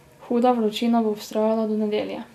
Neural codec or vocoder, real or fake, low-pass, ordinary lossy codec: none; real; 14.4 kHz; none